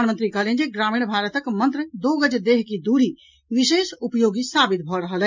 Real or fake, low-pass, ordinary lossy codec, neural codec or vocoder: real; 7.2 kHz; MP3, 64 kbps; none